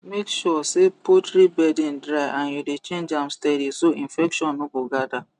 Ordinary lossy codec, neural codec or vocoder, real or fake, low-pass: none; none; real; 10.8 kHz